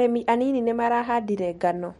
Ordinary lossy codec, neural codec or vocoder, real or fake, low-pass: MP3, 48 kbps; none; real; 19.8 kHz